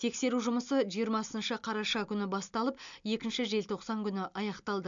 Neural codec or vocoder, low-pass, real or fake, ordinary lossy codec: none; 7.2 kHz; real; MP3, 64 kbps